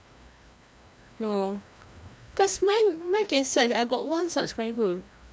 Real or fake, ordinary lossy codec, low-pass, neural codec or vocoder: fake; none; none; codec, 16 kHz, 1 kbps, FreqCodec, larger model